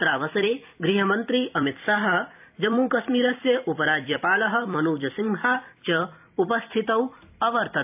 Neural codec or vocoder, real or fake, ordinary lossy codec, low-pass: vocoder, 44.1 kHz, 128 mel bands every 256 samples, BigVGAN v2; fake; AAC, 32 kbps; 3.6 kHz